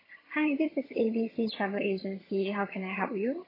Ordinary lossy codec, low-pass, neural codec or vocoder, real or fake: AAC, 24 kbps; 5.4 kHz; vocoder, 22.05 kHz, 80 mel bands, HiFi-GAN; fake